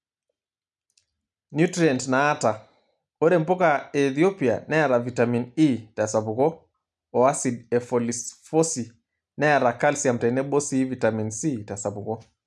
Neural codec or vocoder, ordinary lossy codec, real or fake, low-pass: none; none; real; none